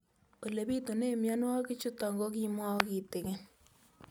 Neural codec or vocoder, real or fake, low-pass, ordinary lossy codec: none; real; none; none